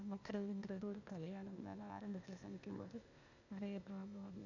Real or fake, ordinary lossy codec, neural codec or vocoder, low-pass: fake; none; codec, 16 kHz, 0.8 kbps, ZipCodec; 7.2 kHz